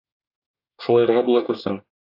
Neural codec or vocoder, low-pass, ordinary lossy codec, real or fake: codec, 24 kHz, 1 kbps, SNAC; 5.4 kHz; AAC, 48 kbps; fake